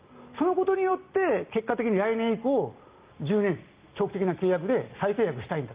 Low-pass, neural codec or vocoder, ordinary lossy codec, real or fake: 3.6 kHz; none; Opus, 24 kbps; real